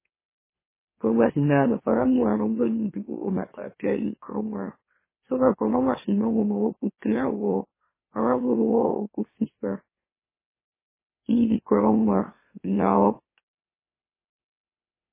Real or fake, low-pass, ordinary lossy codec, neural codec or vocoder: fake; 3.6 kHz; MP3, 16 kbps; autoencoder, 44.1 kHz, a latent of 192 numbers a frame, MeloTTS